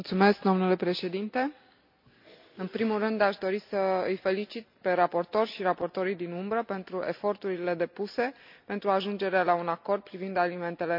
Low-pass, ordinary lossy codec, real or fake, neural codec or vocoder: 5.4 kHz; MP3, 48 kbps; real; none